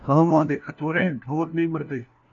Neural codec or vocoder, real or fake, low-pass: codec, 16 kHz, 1 kbps, FunCodec, trained on LibriTTS, 50 frames a second; fake; 7.2 kHz